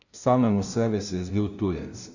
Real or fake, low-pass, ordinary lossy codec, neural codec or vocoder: fake; 7.2 kHz; none; codec, 16 kHz, 0.5 kbps, FunCodec, trained on LibriTTS, 25 frames a second